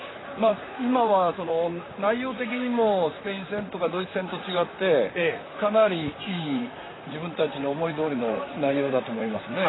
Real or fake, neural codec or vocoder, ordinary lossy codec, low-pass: fake; vocoder, 44.1 kHz, 128 mel bands, Pupu-Vocoder; AAC, 16 kbps; 7.2 kHz